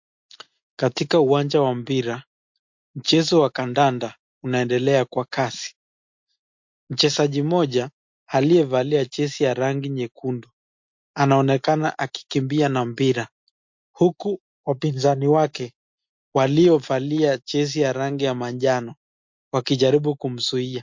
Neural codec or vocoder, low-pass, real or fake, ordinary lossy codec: none; 7.2 kHz; real; MP3, 48 kbps